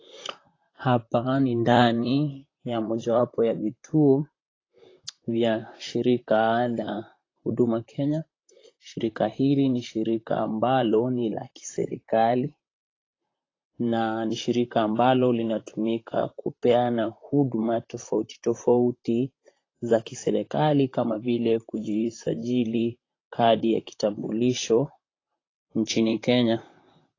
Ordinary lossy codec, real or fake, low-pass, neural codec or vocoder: AAC, 32 kbps; fake; 7.2 kHz; vocoder, 44.1 kHz, 128 mel bands, Pupu-Vocoder